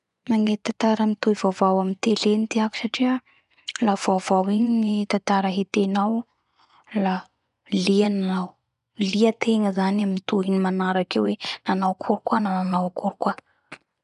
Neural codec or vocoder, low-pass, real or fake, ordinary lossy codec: vocoder, 24 kHz, 100 mel bands, Vocos; 10.8 kHz; fake; none